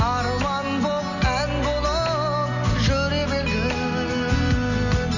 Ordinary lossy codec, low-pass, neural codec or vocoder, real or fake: none; 7.2 kHz; none; real